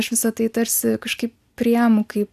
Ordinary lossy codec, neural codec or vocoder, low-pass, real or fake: AAC, 96 kbps; none; 14.4 kHz; real